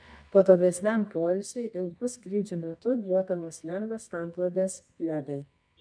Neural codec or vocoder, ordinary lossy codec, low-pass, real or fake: codec, 24 kHz, 0.9 kbps, WavTokenizer, medium music audio release; MP3, 96 kbps; 9.9 kHz; fake